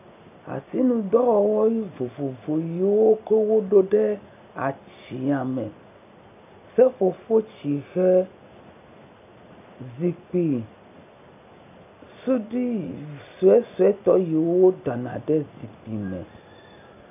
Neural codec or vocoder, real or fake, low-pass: none; real; 3.6 kHz